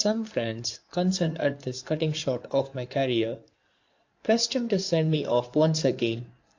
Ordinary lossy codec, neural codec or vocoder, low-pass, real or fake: AAC, 48 kbps; codec, 16 kHz in and 24 kHz out, 2.2 kbps, FireRedTTS-2 codec; 7.2 kHz; fake